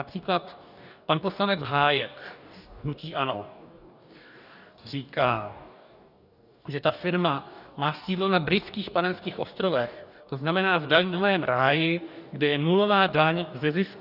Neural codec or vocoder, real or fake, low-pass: codec, 44.1 kHz, 2.6 kbps, DAC; fake; 5.4 kHz